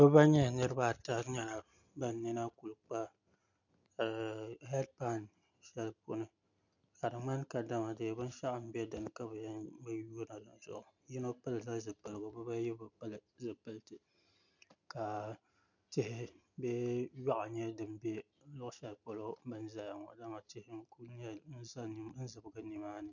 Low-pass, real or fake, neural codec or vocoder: 7.2 kHz; real; none